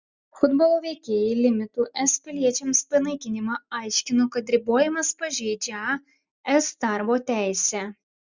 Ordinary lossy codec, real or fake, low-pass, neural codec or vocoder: Opus, 64 kbps; real; 7.2 kHz; none